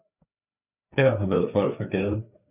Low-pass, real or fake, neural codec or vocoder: 3.6 kHz; fake; codec, 16 kHz, 4 kbps, FreqCodec, larger model